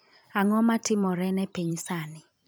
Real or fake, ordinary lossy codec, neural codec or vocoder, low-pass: fake; none; vocoder, 44.1 kHz, 128 mel bands every 512 samples, BigVGAN v2; none